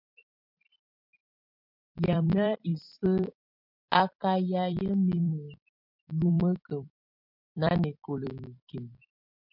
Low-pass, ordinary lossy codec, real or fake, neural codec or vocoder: 5.4 kHz; MP3, 48 kbps; fake; vocoder, 44.1 kHz, 128 mel bands every 256 samples, BigVGAN v2